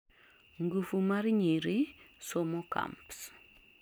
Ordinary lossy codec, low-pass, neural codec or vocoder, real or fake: none; none; none; real